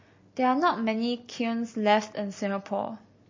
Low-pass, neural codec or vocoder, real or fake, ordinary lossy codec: 7.2 kHz; none; real; MP3, 32 kbps